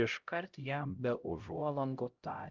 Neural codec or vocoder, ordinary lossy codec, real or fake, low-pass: codec, 16 kHz, 0.5 kbps, X-Codec, HuBERT features, trained on LibriSpeech; Opus, 24 kbps; fake; 7.2 kHz